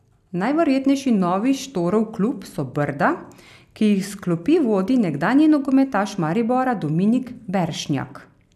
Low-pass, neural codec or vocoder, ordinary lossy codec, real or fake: 14.4 kHz; none; none; real